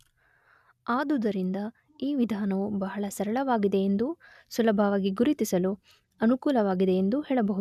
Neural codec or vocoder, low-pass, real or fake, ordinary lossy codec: none; 14.4 kHz; real; none